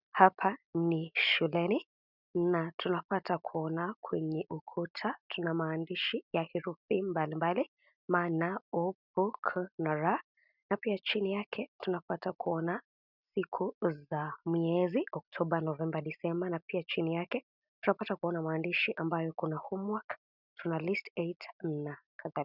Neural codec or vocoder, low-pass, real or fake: none; 5.4 kHz; real